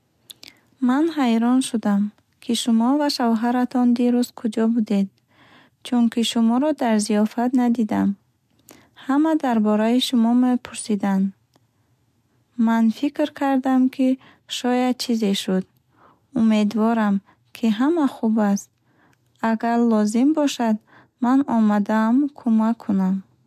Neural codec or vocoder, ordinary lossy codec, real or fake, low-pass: none; MP3, 96 kbps; real; 14.4 kHz